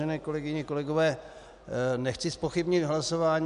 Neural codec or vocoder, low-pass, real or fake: none; 10.8 kHz; real